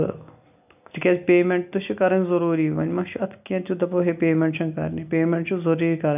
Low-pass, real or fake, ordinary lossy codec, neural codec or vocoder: 3.6 kHz; real; none; none